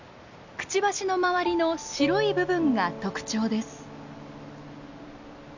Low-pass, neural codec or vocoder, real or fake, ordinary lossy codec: 7.2 kHz; none; real; none